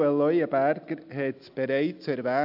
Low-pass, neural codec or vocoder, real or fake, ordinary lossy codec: 5.4 kHz; none; real; AAC, 48 kbps